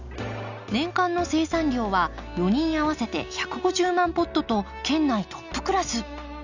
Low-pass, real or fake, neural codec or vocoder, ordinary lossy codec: 7.2 kHz; real; none; none